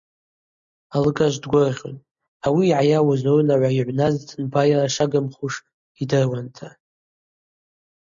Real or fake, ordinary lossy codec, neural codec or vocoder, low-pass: real; MP3, 64 kbps; none; 7.2 kHz